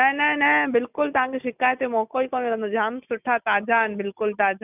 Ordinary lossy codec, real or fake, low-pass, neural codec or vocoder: none; real; 3.6 kHz; none